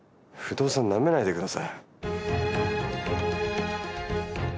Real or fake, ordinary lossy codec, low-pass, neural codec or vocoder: real; none; none; none